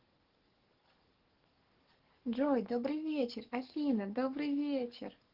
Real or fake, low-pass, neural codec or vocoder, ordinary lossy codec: fake; 5.4 kHz; codec, 44.1 kHz, 7.8 kbps, DAC; Opus, 16 kbps